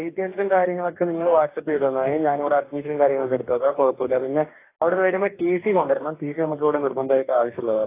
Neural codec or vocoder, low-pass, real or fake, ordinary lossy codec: codec, 44.1 kHz, 2.6 kbps, DAC; 3.6 kHz; fake; AAC, 24 kbps